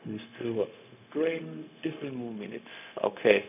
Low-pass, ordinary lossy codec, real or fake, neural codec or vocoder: 3.6 kHz; none; fake; codec, 16 kHz, 0.4 kbps, LongCat-Audio-Codec